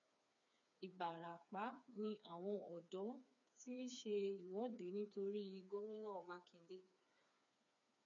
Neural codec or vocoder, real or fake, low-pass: codec, 16 kHz, 4 kbps, FreqCodec, smaller model; fake; 7.2 kHz